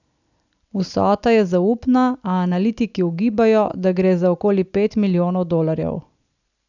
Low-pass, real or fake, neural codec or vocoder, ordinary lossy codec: 7.2 kHz; real; none; none